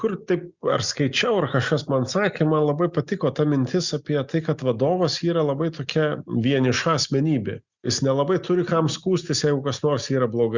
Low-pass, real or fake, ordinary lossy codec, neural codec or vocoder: 7.2 kHz; real; Opus, 64 kbps; none